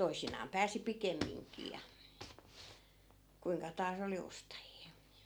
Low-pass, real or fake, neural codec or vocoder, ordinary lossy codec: none; real; none; none